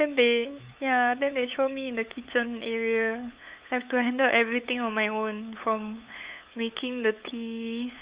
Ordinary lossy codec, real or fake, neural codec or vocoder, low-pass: Opus, 64 kbps; fake; codec, 16 kHz, 8 kbps, FunCodec, trained on LibriTTS, 25 frames a second; 3.6 kHz